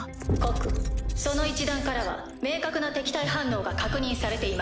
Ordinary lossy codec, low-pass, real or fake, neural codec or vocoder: none; none; real; none